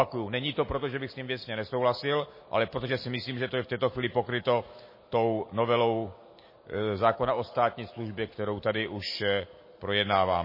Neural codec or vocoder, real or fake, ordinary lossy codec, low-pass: none; real; MP3, 24 kbps; 5.4 kHz